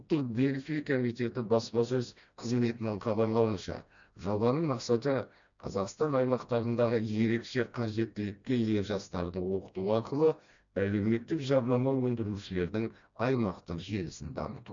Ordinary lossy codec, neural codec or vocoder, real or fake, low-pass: MP3, 64 kbps; codec, 16 kHz, 1 kbps, FreqCodec, smaller model; fake; 7.2 kHz